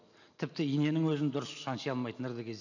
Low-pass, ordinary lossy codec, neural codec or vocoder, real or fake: 7.2 kHz; none; none; real